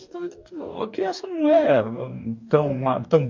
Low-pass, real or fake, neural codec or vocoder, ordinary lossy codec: 7.2 kHz; fake; codec, 44.1 kHz, 2.6 kbps, DAC; MP3, 48 kbps